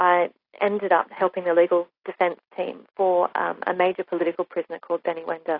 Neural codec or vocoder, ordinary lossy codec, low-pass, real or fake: none; AAC, 32 kbps; 5.4 kHz; real